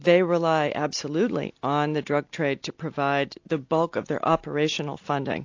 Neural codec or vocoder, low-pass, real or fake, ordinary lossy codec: none; 7.2 kHz; real; AAC, 48 kbps